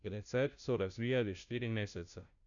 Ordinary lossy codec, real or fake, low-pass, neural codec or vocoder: none; fake; 7.2 kHz; codec, 16 kHz, 0.5 kbps, FunCodec, trained on Chinese and English, 25 frames a second